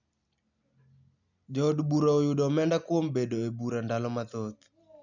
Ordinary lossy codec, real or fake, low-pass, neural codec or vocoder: none; real; 7.2 kHz; none